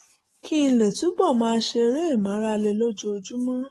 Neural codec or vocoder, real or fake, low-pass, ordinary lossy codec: codec, 44.1 kHz, 7.8 kbps, DAC; fake; 19.8 kHz; AAC, 32 kbps